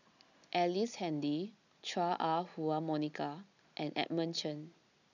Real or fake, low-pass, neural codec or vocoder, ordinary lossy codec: real; 7.2 kHz; none; none